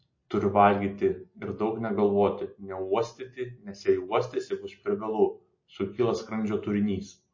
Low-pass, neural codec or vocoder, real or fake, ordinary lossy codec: 7.2 kHz; none; real; MP3, 32 kbps